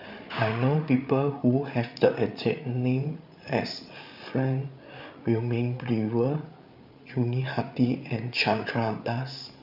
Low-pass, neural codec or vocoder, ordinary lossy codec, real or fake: 5.4 kHz; codec, 16 kHz, 8 kbps, FreqCodec, larger model; none; fake